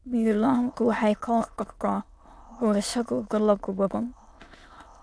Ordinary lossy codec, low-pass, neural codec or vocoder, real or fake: none; none; autoencoder, 22.05 kHz, a latent of 192 numbers a frame, VITS, trained on many speakers; fake